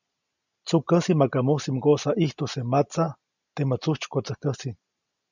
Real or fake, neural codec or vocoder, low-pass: real; none; 7.2 kHz